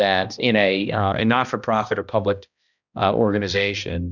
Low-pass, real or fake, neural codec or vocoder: 7.2 kHz; fake; codec, 16 kHz, 1 kbps, X-Codec, HuBERT features, trained on balanced general audio